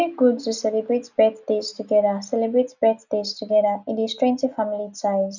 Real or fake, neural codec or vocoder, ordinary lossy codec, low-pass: real; none; none; 7.2 kHz